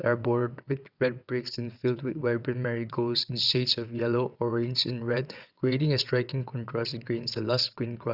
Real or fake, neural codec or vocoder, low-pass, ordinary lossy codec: fake; vocoder, 22.05 kHz, 80 mel bands, WaveNeXt; 5.4 kHz; none